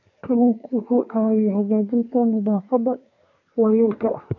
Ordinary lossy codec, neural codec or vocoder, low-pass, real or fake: none; codec, 24 kHz, 1 kbps, SNAC; 7.2 kHz; fake